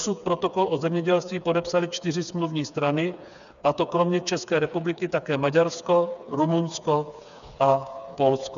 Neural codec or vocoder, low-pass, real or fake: codec, 16 kHz, 4 kbps, FreqCodec, smaller model; 7.2 kHz; fake